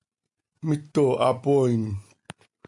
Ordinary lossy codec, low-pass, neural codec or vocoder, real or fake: MP3, 96 kbps; 10.8 kHz; none; real